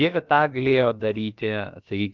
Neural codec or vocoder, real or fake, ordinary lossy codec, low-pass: codec, 16 kHz, about 1 kbps, DyCAST, with the encoder's durations; fake; Opus, 24 kbps; 7.2 kHz